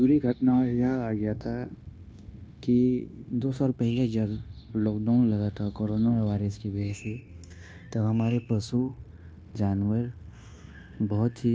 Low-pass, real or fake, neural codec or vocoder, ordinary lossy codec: none; fake; codec, 16 kHz, 0.9 kbps, LongCat-Audio-Codec; none